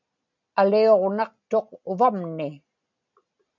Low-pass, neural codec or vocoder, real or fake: 7.2 kHz; none; real